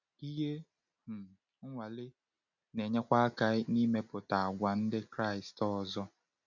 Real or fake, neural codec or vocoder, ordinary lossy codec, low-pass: real; none; none; 7.2 kHz